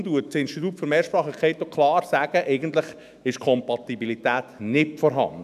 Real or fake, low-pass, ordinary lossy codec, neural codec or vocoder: fake; 14.4 kHz; none; autoencoder, 48 kHz, 128 numbers a frame, DAC-VAE, trained on Japanese speech